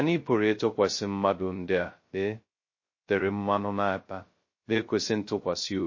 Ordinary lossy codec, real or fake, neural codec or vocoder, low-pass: MP3, 32 kbps; fake; codec, 16 kHz, 0.2 kbps, FocalCodec; 7.2 kHz